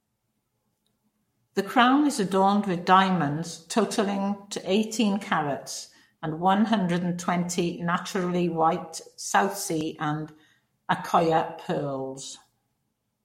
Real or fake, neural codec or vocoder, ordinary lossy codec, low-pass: fake; codec, 44.1 kHz, 7.8 kbps, Pupu-Codec; MP3, 64 kbps; 19.8 kHz